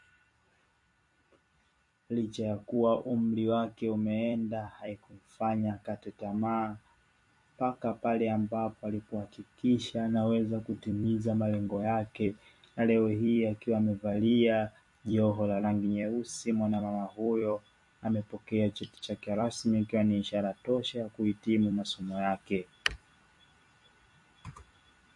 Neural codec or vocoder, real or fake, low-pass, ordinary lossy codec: vocoder, 44.1 kHz, 128 mel bands every 256 samples, BigVGAN v2; fake; 10.8 kHz; MP3, 48 kbps